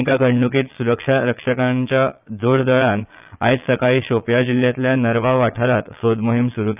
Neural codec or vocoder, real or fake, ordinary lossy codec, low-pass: vocoder, 22.05 kHz, 80 mel bands, Vocos; fake; none; 3.6 kHz